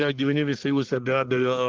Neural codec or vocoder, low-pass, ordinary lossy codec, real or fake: codec, 44.1 kHz, 1.7 kbps, Pupu-Codec; 7.2 kHz; Opus, 16 kbps; fake